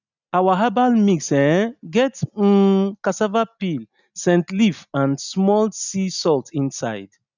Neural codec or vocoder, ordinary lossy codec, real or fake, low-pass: none; none; real; 7.2 kHz